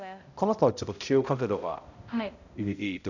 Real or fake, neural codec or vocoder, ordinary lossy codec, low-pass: fake; codec, 16 kHz, 0.5 kbps, X-Codec, HuBERT features, trained on balanced general audio; none; 7.2 kHz